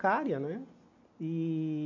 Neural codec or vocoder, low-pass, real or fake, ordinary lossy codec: none; 7.2 kHz; real; MP3, 48 kbps